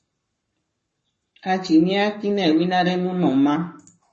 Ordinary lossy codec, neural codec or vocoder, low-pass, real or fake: MP3, 32 kbps; codec, 44.1 kHz, 7.8 kbps, Pupu-Codec; 10.8 kHz; fake